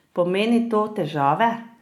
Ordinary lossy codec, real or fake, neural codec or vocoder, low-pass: none; real; none; 19.8 kHz